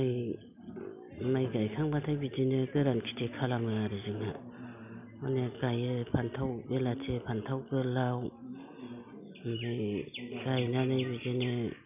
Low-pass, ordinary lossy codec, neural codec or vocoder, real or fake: 3.6 kHz; none; none; real